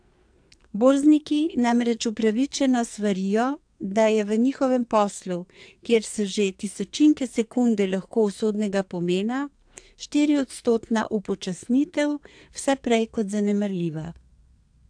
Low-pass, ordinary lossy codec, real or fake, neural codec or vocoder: 9.9 kHz; AAC, 64 kbps; fake; codec, 44.1 kHz, 2.6 kbps, SNAC